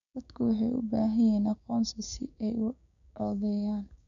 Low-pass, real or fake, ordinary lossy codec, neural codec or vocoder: 7.2 kHz; fake; none; codec, 16 kHz, 6 kbps, DAC